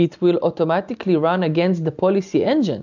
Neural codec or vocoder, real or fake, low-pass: none; real; 7.2 kHz